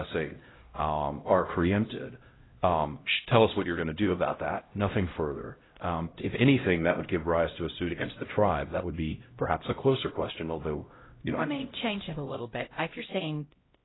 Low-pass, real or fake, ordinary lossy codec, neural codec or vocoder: 7.2 kHz; fake; AAC, 16 kbps; codec, 16 kHz, 0.5 kbps, X-Codec, HuBERT features, trained on LibriSpeech